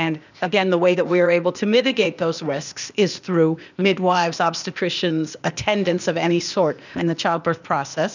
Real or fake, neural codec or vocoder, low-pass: fake; codec, 16 kHz, 0.8 kbps, ZipCodec; 7.2 kHz